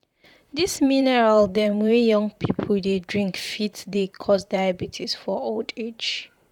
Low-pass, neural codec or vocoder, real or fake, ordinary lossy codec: 19.8 kHz; vocoder, 44.1 kHz, 128 mel bands, Pupu-Vocoder; fake; none